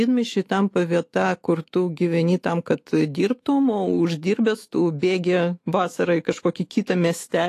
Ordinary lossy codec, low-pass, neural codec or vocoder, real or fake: AAC, 48 kbps; 14.4 kHz; autoencoder, 48 kHz, 128 numbers a frame, DAC-VAE, trained on Japanese speech; fake